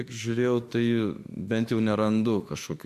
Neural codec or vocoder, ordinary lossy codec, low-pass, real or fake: autoencoder, 48 kHz, 32 numbers a frame, DAC-VAE, trained on Japanese speech; MP3, 64 kbps; 14.4 kHz; fake